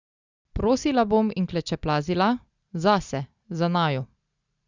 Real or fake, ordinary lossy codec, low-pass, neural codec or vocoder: real; Opus, 64 kbps; 7.2 kHz; none